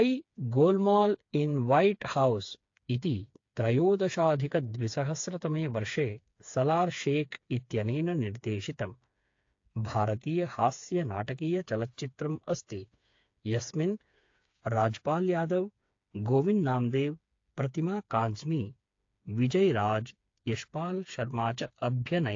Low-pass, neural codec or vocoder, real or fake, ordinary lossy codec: 7.2 kHz; codec, 16 kHz, 4 kbps, FreqCodec, smaller model; fake; AAC, 48 kbps